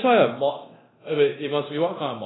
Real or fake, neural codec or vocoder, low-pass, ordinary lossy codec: fake; codec, 24 kHz, 0.9 kbps, DualCodec; 7.2 kHz; AAC, 16 kbps